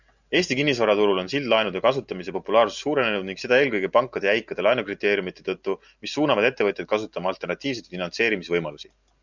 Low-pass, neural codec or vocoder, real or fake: 7.2 kHz; none; real